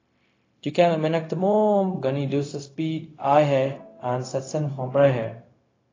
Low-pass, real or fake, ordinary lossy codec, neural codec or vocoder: 7.2 kHz; fake; AAC, 32 kbps; codec, 16 kHz, 0.4 kbps, LongCat-Audio-Codec